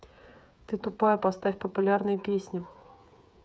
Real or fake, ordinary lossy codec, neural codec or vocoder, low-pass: fake; none; codec, 16 kHz, 8 kbps, FreqCodec, smaller model; none